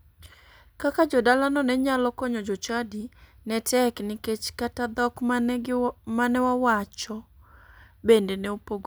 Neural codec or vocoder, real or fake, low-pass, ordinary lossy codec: none; real; none; none